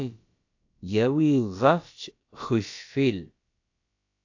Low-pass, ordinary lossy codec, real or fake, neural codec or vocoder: 7.2 kHz; AAC, 48 kbps; fake; codec, 16 kHz, about 1 kbps, DyCAST, with the encoder's durations